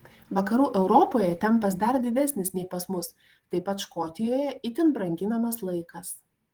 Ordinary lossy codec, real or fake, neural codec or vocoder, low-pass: Opus, 24 kbps; fake; vocoder, 44.1 kHz, 128 mel bands, Pupu-Vocoder; 19.8 kHz